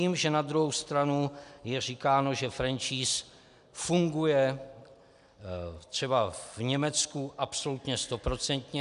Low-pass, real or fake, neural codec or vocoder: 10.8 kHz; real; none